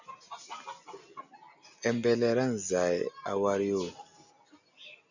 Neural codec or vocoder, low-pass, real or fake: none; 7.2 kHz; real